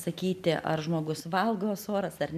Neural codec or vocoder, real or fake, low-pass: none; real; 14.4 kHz